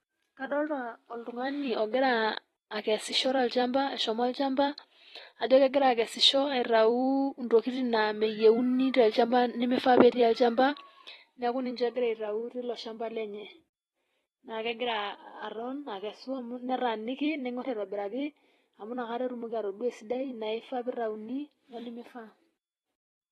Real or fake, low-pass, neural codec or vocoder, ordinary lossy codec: fake; 19.8 kHz; vocoder, 44.1 kHz, 128 mel bands every 256 samples, BigVGAN v2; AAC, 32 kbps